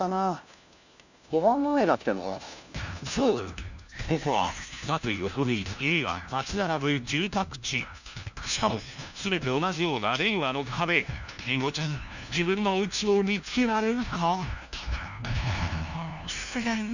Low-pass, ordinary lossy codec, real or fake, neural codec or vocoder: 7.2 kHz; none; fake; codec, 16 kHz, 1 kbps, FunCodec, trained on LibriTTS, 50 frames a second